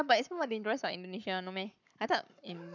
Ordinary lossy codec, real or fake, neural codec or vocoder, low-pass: none; fake; codec, 44.1 kHz, 7.8 kbps, Pupu-Codec; 7.2 kHz